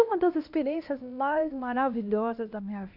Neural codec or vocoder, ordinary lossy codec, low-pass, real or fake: codec, 16 kHz, 1 kbps, X-Codec, HuBERT features, trained on LibriSpeech; none; 5.4 kHz; fake